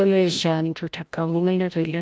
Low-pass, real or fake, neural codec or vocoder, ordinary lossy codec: none; fake; codec, 16 kHz, 0.5 kbps, FreqCodec, larger model; none